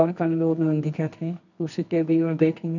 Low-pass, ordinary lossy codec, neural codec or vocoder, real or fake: 7.2 kHz; none; codec, 24 kHz, 0.9 kbps, WavTokenizer, medium music audio release; fake